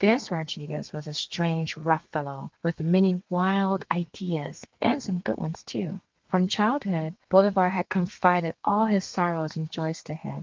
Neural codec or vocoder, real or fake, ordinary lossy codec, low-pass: codec, 32 kHz, 1.9 kbps, SNAC; fake; Opus, 32 kbps; 7.2 kHz